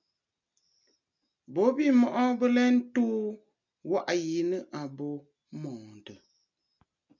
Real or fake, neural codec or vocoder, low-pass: real; none; 7.2 kHz